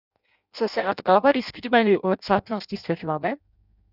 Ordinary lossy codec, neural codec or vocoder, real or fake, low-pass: none; codec, 16 kHz in and 24 kHz out, 0.6 kbps, FireRedTTS-2 codec; fake; 5.4 kHz